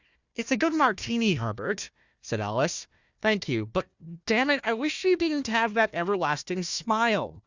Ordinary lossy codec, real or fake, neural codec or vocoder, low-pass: Opus, 64 kbps; fake; codec, 16 kHz, 1 kbps, FunCodec, trained on Chinese and English, 50 frames a second; 7.2 kHz